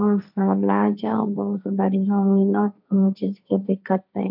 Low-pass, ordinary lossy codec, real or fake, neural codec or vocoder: 5.4 kHz; none; fake; codec, 16 kHz, 1.1 kbps, Voila-Tokenizer